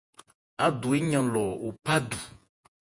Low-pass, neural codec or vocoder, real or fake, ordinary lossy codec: 10.8 kHz; vocoder, 48 kHz, 128 mel bands, Vocos; fake; MP3, 64 kbps